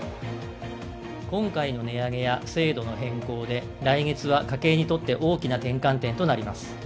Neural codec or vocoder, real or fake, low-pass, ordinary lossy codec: none; real; none; none